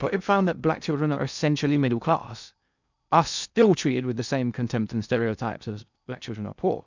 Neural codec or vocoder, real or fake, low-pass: codec, 16 kHz in and 24 kHz out, 0.6 kbps, FocalCodec, streaming, 2048 codes; fake; 7.2 kHz